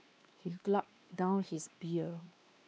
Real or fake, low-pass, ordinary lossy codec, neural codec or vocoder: fake; none; none; codec, 16 kHz, 2 kbps, X-Codec, WavLM features, trained on Multilingual LibriSpeech